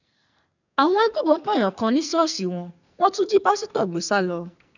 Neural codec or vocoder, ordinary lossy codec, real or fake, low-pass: codec, 44.1 kHz, 2.6 kbps, SNAC; none; fake; 7.2 kHz